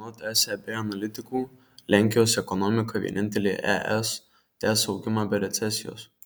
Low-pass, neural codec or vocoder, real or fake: 19.8 kHz; none; real